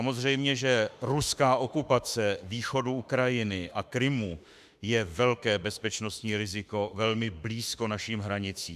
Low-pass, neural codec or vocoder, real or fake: 14.4 kHz; autoencoder, 48 kHz, 32 numbers a frame, DAC-VAE, trained on Japanese speech; fake